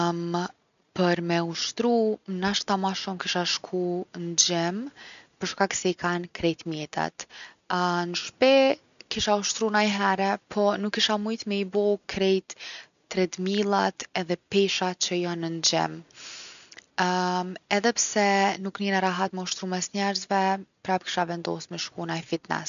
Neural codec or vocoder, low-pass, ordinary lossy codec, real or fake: none; 7.2 kHz; none; real